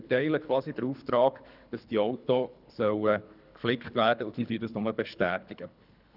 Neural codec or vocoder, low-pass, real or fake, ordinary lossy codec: codec, 24 kHz, 3 kbps, HILCodec; 5.4 kHz; fake; none